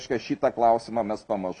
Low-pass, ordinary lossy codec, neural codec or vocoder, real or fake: 10.8 kHz; MP3, 96 kbps; none; real